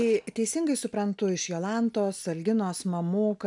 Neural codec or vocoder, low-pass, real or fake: none; 10.8 kHz; real